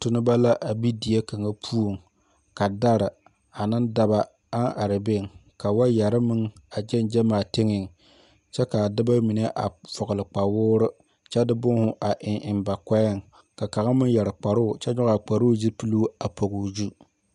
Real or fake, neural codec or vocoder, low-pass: real; none; 10.8 kHz